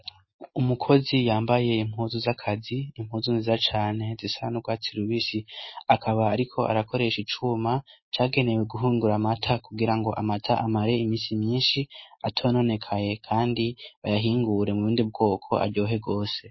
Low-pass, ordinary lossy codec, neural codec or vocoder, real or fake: 7.2 kHz; MP3, 24 kbps; none; real